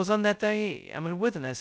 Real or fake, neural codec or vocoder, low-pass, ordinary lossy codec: fake; codec, 16 kHz, 0.2 kbps, FocalCodec; none; none